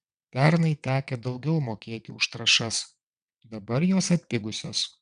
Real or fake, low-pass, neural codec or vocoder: fake; 9.9 kHz; vocoder, 22.05 kHz, 80 mel bands, WaveNeXt